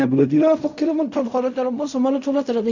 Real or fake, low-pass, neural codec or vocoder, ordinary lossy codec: fake; 7.2 kHz; codec, 16 kHz in and 24 kHz out, 0.4 kbps, LongCat-Audio-Codec, fine tuned four codebook decoder; none